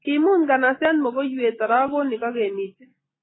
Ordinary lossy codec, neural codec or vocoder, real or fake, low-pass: AAC, 16 kbps; none; real; 7.2 kHz